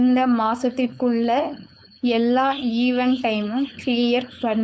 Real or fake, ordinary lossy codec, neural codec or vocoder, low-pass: fake; none; codec, 16 kHz, 4.8 kbps, FACodec; none